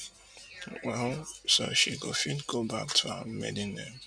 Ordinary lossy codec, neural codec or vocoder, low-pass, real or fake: none; none; 9.9 kHz; real